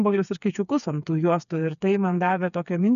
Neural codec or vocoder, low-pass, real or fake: codec, 16 kHz, 4 kbps, FreqCodec, smaller model; 7.2 kHz; fake